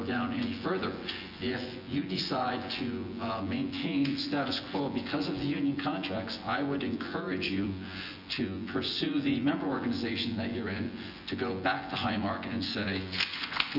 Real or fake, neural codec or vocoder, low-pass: fake; vocoder, 24 kHz, 100 mel bands, Vocos; 5.4 kHz